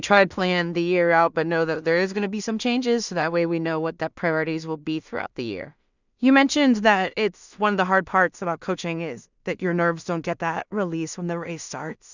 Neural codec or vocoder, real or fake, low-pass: codec, 16 kHz in and 24 kHz out, 0.4 kbps, LongCat-Audio-Codec, two codebook decoder; fake; 7.2 kHz